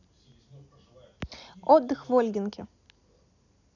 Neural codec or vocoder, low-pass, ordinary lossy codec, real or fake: none; 7.2 kHz; none; real